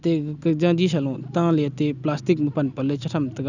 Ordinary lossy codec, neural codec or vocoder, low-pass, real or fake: none; none; 7.2 kHz; real